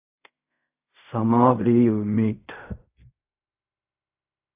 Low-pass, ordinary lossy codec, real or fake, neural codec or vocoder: 3.6 kHz; AAC, 32 kbps; fake; codec, 16 kHz in and 24 kHz out, 0.4 kbps, LongCat-Audio-Codec, fine tuned four codebook decoder